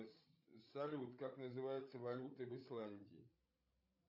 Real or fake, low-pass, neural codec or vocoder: fake; 5.4 kHz; codec, 16 kHz, 8 kbps, FreqCodec, larger model